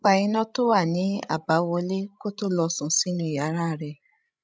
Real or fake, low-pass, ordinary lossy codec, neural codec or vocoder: fake; none; none; codec, 16 kHz, 16 kbps, FreqCodec, larger model